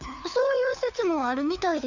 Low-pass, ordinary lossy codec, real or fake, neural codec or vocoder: 7.2 kHz; none; fake; codec, 16 kHz, 4 kbps, FunCodec, trained on LibriTTS, 50 frames a second